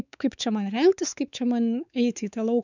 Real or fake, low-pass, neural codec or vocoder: fake; 7.2 kHz; codec, 16 kHz, 4 kbps, X-Codec, WavLM features, trained on Multilingual LibriSpeech